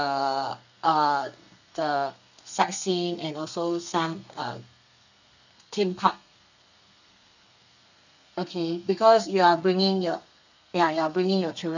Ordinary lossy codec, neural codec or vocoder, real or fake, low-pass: none; codec, 32 kHz, 1.9 kbps, SNAC; fake; 7.2 kHz